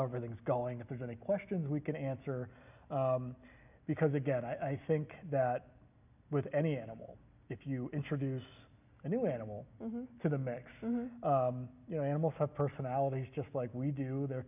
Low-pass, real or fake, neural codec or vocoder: 3.6 kHz; real; none